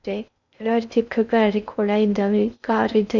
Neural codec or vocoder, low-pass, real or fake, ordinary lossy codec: codec, 16 kHz in and 24 kHz out, 0.6 kbps, FocalCodec, streaming, 2048 codes; 7.2 kHz; fake; none